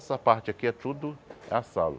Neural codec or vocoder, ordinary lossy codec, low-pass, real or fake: none; none; none; real